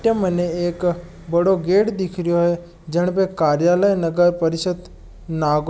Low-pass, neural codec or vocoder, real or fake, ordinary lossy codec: none; none; real; none